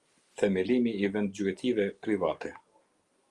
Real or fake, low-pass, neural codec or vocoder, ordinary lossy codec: real; 10.8 kHz; none; Opus, 32 kbps